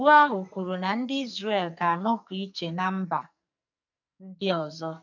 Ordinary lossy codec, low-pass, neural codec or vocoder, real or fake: none; 7.2 kHz; codec, 44.1 kHz, 2.6 kbps, SNAC; fake